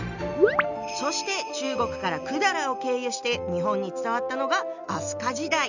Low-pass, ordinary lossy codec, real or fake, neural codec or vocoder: 7.2 kHz; none; real; none